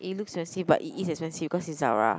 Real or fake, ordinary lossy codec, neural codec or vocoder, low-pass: real; none; none; none